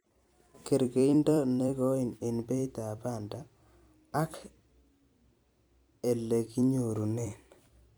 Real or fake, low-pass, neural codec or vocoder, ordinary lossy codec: fake; none; vocoder, 44.1 kHz, 128 mel bands every 256 samples, BigVGAN v2; none